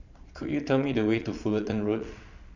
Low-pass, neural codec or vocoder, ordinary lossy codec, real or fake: 7.2 kHz; vocoder, 22.05 kHz, 80 mel bands, Vocos; none; fake